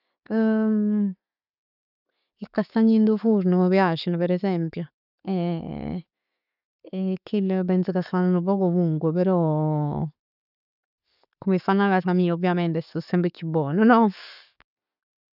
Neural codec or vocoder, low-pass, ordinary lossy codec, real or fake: none; 5.4 kHz; none; real